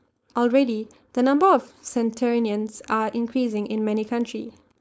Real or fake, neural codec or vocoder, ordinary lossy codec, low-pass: fake; codec, 16 kHz, 4.8 kbps, FACodec; none; none